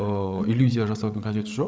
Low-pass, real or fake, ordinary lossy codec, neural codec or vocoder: none; fake; none; codec, 16 kHz, 16 kbps, FreqCodec, larger model